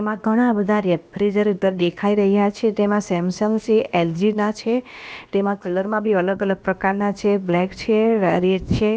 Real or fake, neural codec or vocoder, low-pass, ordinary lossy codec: fake; codec, 16 kHz, about 1 kbps, DyCAST, with the encoder's durations; none; none